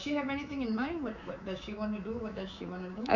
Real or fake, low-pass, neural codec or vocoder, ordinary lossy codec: fake; 7.2 kHz; codec, 24 kHz, 3.1 kbps, DualCodec; none